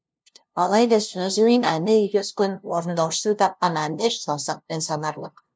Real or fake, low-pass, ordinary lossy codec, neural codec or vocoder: fake; none; none; codec, 16 kHz, 0.5 kbps, FunCodec, trained on LibriTTS, 25 frames a second